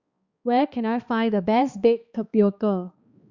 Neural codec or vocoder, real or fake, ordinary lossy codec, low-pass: codec, 16 kHz, 2 kbps, X-Codec, HuBERT features, trained on balanced general audio; fake; Opus, 64 kbps; 7.2 kHz